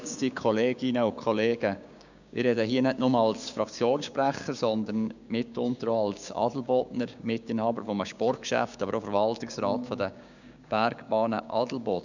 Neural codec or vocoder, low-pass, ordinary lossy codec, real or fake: codec, 16 kHz, 6 kbps, DAC; 7.2 kHz; none; fake